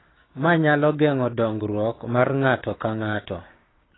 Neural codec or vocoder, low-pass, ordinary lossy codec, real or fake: codec, 16 kHz, 6 kbps, DAC; 7.2 kHz; AAC, 16 kbps; fake